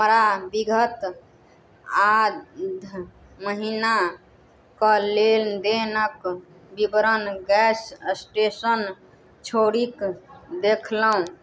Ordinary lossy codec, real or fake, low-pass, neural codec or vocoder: none; real; none; none